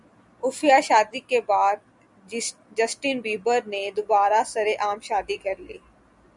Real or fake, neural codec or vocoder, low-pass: real; none; 10.8 kHz